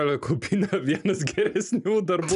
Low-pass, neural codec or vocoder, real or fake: 10.8 kHz; none; real